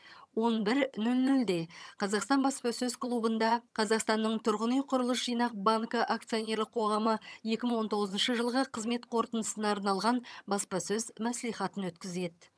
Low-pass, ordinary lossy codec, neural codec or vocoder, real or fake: none; none; vocoder, 22.05 kHz, 80 mel bands, HiFi-GAN; fake